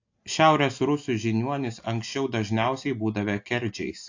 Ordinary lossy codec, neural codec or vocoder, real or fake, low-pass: AAC, 48 kbps; none; real; 7.2 kHz